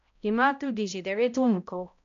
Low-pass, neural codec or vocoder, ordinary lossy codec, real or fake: 7.2 kHz; codec, 16 kHz, 0.5 kbps, X-Codec, HuBERT features, trained on balanced general audio; AAC, 64 kbps; fake